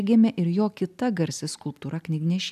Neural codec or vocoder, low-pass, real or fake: none; 14.4 kHz; real